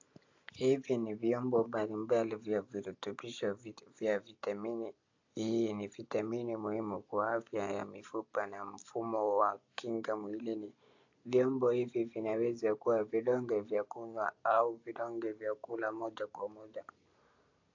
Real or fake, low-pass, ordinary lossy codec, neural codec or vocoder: real; 7.2 kHz; AAC, 48 kbps; none